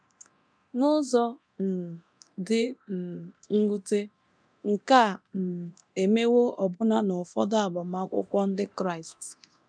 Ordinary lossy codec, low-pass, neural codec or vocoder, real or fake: none; 9.9 kHz; codec, 24 kHz, 0.9 kbps, DualCodec; fake